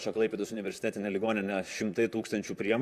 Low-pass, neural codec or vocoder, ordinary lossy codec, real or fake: 14.4 kHz; vocoder, 44.1 kHz, 128 mel bands, Pupu-Vocoder; Opus, 64 kbps; fake